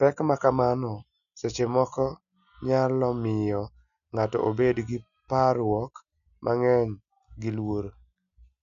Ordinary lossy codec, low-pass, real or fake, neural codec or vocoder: none; 7.2 kHz; real; none